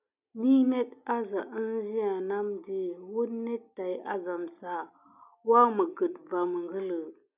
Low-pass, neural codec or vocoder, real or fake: 3.6 kHz; none; real